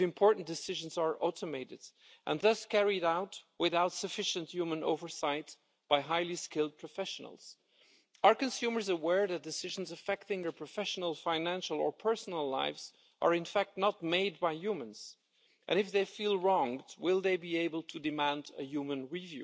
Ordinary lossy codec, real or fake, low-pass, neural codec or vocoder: none; real; none; none